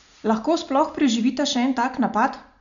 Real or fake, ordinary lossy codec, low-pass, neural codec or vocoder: real; none; 7.2 kHz; none